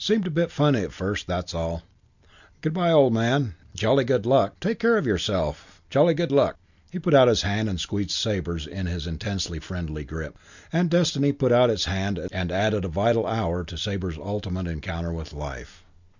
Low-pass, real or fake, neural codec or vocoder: 7.2 kHz; real; none